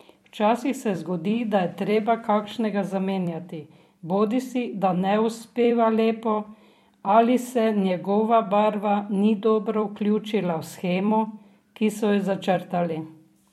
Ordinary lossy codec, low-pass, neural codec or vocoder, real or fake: MP3, 64 kbps; 19.8 kHz; vocoder, 44.1 kHz, 128 mel bands every 256 samples, BigVGAN v2; fake